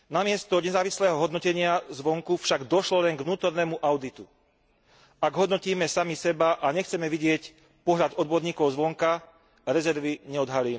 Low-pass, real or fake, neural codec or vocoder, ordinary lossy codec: none; real; none; none